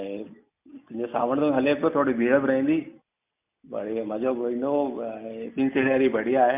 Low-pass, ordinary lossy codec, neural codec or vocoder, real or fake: 3.6 kHz; MP3, 32 kbps; none; real